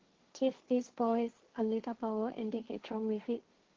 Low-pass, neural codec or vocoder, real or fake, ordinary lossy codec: 7.2 kHz; codec, 16 kHz, 1.1 kbps, Voila-Tokenizer; fake; Opus, 16 kbps